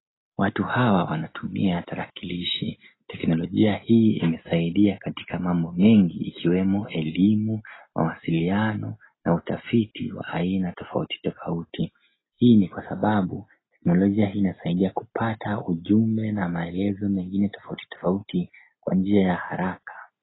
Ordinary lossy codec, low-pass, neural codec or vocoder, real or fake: AAC, 16 kbps; 7.2 kHz; none; real